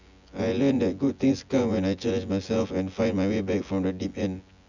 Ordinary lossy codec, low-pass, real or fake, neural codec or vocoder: none; 7.2 kHz; fake; vocoder, 24 kHz, 100 mel bands, Vocos